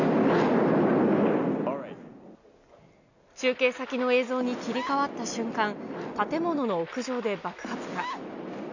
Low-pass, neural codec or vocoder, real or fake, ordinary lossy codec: 7.2 kHz; none; real; none